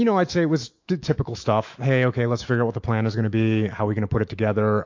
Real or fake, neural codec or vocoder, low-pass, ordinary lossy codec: fake; autoencoder, 48 kHz, 128 numbers a frame, DAC-VAE, trained on Japanese speech; 7.2 kHz; AAC, 48 kbps